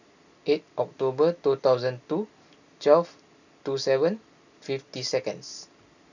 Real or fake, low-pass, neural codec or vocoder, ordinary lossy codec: real; 7.2 kHz; none; none